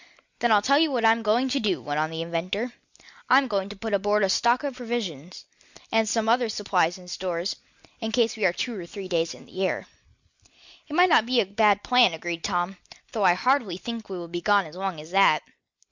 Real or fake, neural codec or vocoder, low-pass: real; none; 7.2 kHz